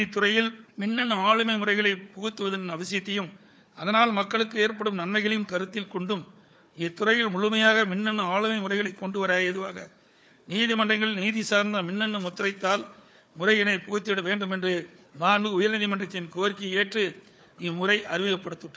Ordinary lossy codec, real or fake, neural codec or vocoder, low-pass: none; fake; codec, 16 kHz, 4 kbps, FunCodec, trained on Chinese and English, 50 frames a second; none